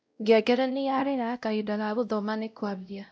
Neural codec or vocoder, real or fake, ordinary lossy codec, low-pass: codec, 16 kHz, 0.5 kbps, X-Codec, WavLM features, trained on Multilingual LibriSpeech; fake; none; none